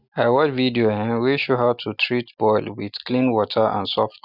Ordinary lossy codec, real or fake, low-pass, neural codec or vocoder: none; real; 5.4 kHz; none